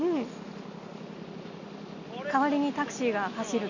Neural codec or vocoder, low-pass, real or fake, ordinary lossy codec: none; 7.2 kHz; real; none